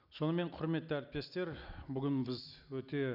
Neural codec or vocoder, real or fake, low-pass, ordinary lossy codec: none; real; 5.4 kHz; none